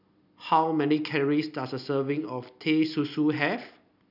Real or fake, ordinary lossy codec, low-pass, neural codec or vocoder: real; none; 5.4 kHz; none